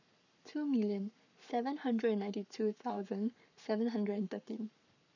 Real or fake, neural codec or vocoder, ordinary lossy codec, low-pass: fake; codec, 44.1 kHz, 7.8 kbps, Pupu-Codec; none; 7.2 kHz